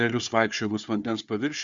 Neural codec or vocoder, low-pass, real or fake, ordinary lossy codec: codec, 16 kHz, 4 kbps, FunCodec, trained on Chinese and English, 50 frames a second; 7.2 kHz; fake; Opus, 64 kbps